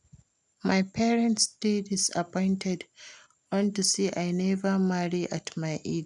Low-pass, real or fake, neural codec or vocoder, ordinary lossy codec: 10.8 kHz; real; none; none